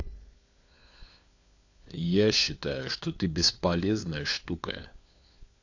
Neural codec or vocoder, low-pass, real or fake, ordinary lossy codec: codec, 16 kHz, 4 kbps, FunCodec, trained on LibriTTS, 50 frames a second; 7.2 kHz; fake; AAC, 48 kbps